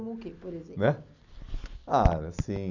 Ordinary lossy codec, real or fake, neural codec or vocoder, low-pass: none; real; none; 7.2 kHz